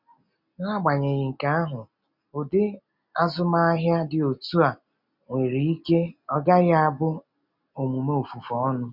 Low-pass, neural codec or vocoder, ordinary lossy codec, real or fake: 5.4 kHz; none; none; real